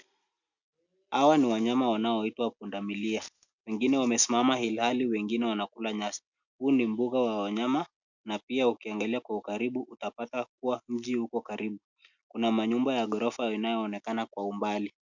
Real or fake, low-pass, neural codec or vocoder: real; 7.2 kHz; none